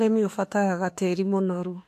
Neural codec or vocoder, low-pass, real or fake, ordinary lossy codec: autoencoder, 48 kHz, 32 numbers a frame, DAC-VAE, trained on Japanese speech; 14.4 kHz; fake; AAC, 64 kbps